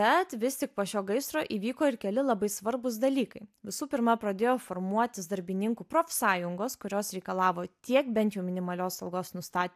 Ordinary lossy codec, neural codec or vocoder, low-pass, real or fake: AAC, 96 kbps; none; 14.4 kHz; real